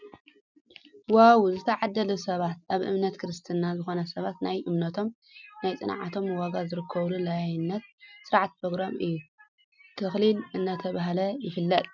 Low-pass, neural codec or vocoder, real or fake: 7.2 kHz; none; real